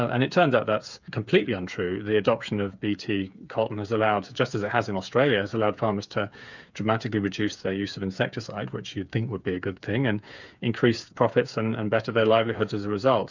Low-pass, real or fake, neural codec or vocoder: 7.2 kHz; fake; codec, 16 kHz, 8 kbps, FreqCodec, smaller model